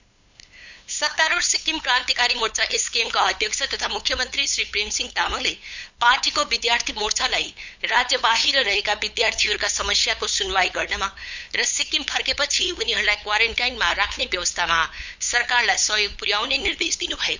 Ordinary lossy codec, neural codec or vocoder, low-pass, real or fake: Opus, 64 kbps; codec, 16 kHz, 8 kbps, FunCodec, trained on LibriTTS, 25 frames a second; 7.2 kHz; fake